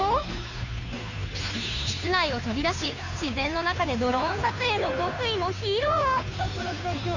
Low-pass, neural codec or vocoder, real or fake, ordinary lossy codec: 7.2 kHz; codec, 16 kHz, 2 kbps, FunCodec, trained on Chinese and English, 25 frames a second; fake; none